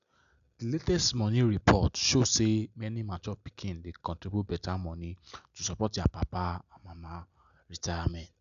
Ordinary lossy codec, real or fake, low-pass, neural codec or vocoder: none; real; 7.2 kHz; none